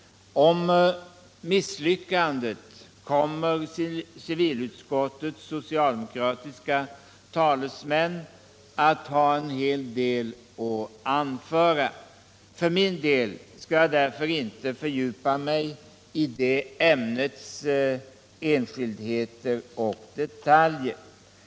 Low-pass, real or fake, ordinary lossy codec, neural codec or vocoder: none; real; none; none